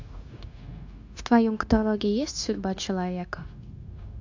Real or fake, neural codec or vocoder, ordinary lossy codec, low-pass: fake; codec, 16 kHz, 0.9 kbps, LongCat-Audio-Codec; AAC, 48 kbps; 7.2 kHz